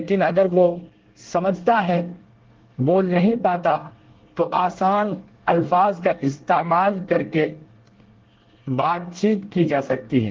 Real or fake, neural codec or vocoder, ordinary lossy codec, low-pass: fake; codec, 24 kHz, 1 kbps, SNAC; Opus, 16 kbps; 7.2 kHz